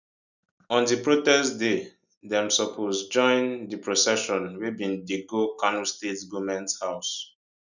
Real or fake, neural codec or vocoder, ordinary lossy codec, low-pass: real; none; none; 7.2 kHz